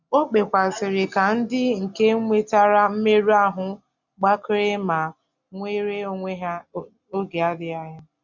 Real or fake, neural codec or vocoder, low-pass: real; none; 7.2 kHz